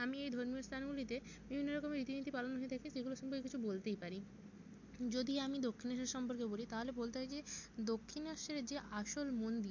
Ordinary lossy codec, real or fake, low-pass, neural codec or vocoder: MP3, 48 kbps; real; 7.2 kHz; none